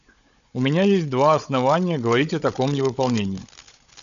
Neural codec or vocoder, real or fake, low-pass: codec, 16 kHz, 16 kbps, FunCodec, trained on Chinese and English, 50 frames a second; fake; 7.2 kHz